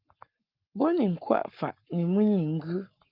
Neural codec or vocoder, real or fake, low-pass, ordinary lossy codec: codec, 44.1 kHz, 7.8 kbps, Pupu-Codec; fake; 5.4 kHz; Opus, 24 kbps